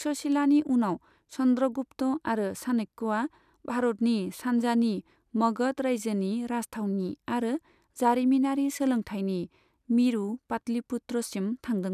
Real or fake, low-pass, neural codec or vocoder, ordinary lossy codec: real; 14.4 kHz; none; none